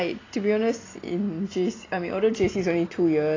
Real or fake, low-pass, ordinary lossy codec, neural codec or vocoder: real; 7.2 kHz; AAC, 32 kbps; none